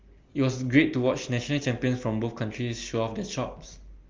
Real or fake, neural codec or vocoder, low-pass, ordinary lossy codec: real; none; 7.2 kHz; Opus, 32 kbps